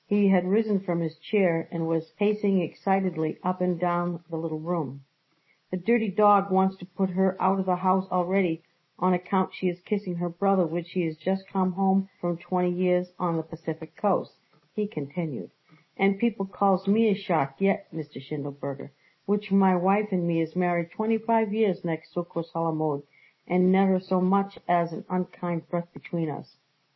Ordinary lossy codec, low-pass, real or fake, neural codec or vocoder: MP3, 24 kbps; 7.2 kHz; real; none